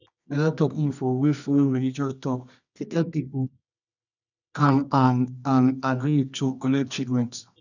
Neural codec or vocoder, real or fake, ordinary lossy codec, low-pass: codec, 24 kHz, 0.9 kbps, WavTokenizer, medium music audio release; fake; none; 7.2 kHz